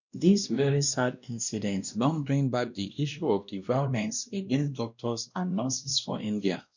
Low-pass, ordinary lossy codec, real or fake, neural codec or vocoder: 7.2 kHz; none; fake; codec, 16 kHz, 1 kbps, X-Codec, WavLM features, trained on Multilingual LibriSpeech